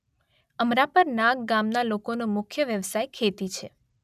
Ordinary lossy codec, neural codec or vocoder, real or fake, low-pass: none; none; real; 14.4 kHz